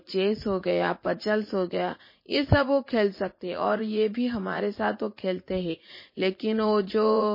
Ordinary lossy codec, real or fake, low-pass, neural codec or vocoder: MP3, 24 kbps; fake; 5.4 kHz; vocoder, 44.1 kHz, 80 mel bands, Vocos